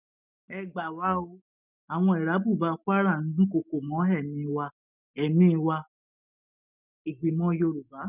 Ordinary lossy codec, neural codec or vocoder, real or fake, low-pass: none; none; real; 3.6 kHz